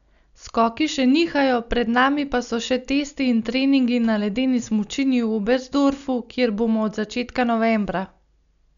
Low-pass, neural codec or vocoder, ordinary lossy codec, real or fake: 7.2 kHz; none; none; real